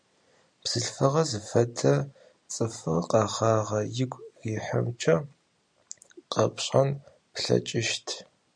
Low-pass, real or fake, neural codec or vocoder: 9.9 kHz; real; none